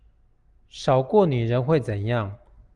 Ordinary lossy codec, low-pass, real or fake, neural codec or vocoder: Opus, 32 kbps; 10.8 kHz; real; none